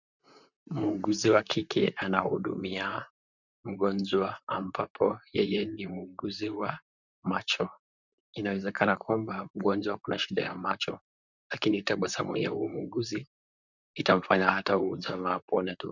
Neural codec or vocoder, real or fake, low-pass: vocoder, 44.1 kHz, 128 mel bands, Pupu-Vocoder; fake; 7.2 kHz